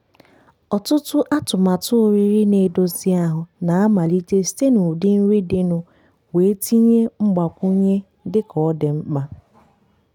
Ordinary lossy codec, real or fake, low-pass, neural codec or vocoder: none; real; 19.8 kHz; none